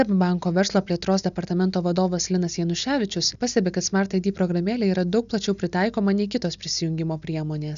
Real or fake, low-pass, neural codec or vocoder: real; 7.2 kHz; none